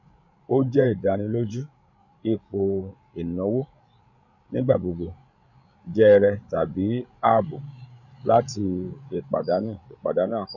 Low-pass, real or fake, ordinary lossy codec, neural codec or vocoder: 7.2 kHz; fake; none; codec, 16 kHz, 16 kbps, FreqCodec, larger model